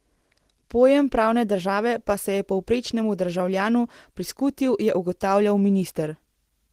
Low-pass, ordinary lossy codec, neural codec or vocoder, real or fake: 14.4 kHz; Opus, 16 kbps; none; real